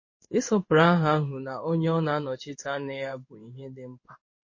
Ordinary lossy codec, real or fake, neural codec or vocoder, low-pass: MP3, 32 kbps; fake; codec, 16 kHz in and 24 kHz out, 1 kbps, XY-Tokenizer; 7.2 kHz